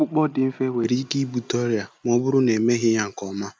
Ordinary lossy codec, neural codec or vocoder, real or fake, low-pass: none; none; real; none